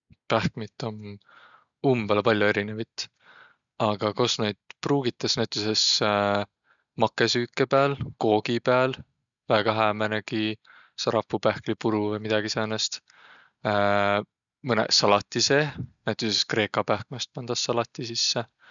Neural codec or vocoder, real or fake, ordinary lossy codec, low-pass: none; real; none; 7.2 kHz